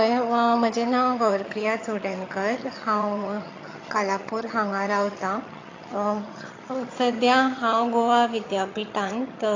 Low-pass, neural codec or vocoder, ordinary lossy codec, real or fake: 7.2 kHz; vocoder, 22.05 kHz, 80 mel bands, HiFi-GAN; AAC, 32 kbps; fake